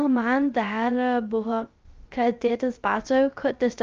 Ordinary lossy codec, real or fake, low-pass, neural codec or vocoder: Opus, 24 kbps; fake; 7.2 kHz; codec, 16 kHz, 0.3 kbps, FocalCodec